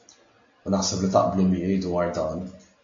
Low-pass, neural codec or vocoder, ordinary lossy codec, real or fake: 7.2 kHz; none; AAC, 48 kbps; real